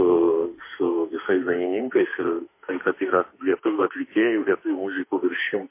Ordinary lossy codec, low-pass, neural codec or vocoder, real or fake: MP3, 24 kbps; 3.6 kHz; autoencoder, 48 kHz, 32 numbers a frame, DAC-VAE, trained on Japanese speech; fake